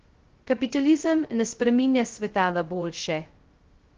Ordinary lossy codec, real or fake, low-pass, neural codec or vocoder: Opus, 16 kbps; fake; 7.2 kHz; codec, 16 kHz, 0.2 kbps, FocalCodec